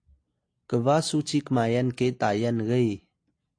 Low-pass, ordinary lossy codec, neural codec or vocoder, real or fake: 9.9 kHz; AAC, 48 kbps; none; real